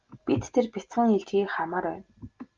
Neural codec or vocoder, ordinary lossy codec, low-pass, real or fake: none; Opus, 32 kbps; 7.2 kHz; real